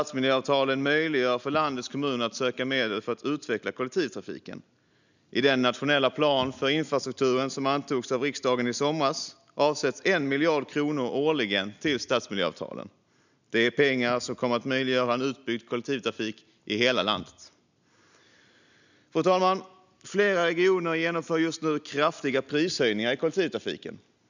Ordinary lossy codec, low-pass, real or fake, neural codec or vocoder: none; 7.2 kHz; real; none